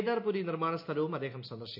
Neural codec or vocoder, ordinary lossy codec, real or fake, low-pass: none; MP3, 48 kbps; real; 5.4 kHz